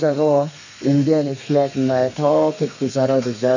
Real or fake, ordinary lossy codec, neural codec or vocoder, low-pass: fake; none; codec, 32 kHz, 1.9 kbps, SNAC; 7.2 kHz